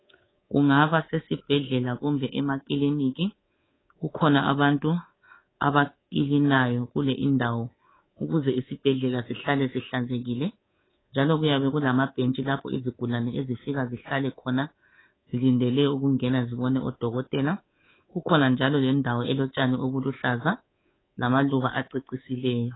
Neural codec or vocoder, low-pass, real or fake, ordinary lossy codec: codec, 24 kHz, 3.1 kbps, DualCodec; 7.2 kHz; fake; AAC, 16 kbps